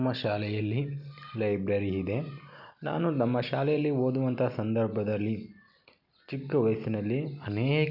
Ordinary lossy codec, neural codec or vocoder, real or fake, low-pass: none; none; real; 5.4 kHz